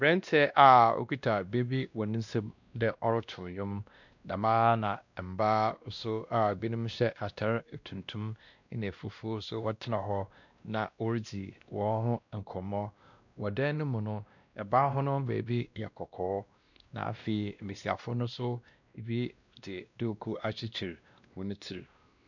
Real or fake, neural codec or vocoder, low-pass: fake; codec, 16 kHz, 1 kbps, X-Codec, WavLM features, trained on Multilingual LibriSpeech; 7.2 kHz